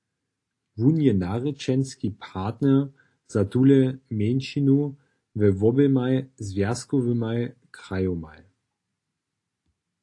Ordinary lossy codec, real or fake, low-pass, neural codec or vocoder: AAC, 48 kbps; real; 10.8 kHz; none